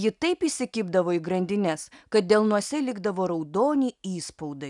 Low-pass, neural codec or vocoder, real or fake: 10.8 kHz; none; real